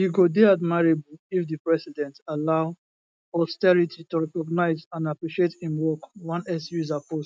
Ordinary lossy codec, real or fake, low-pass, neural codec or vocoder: none; real; none; none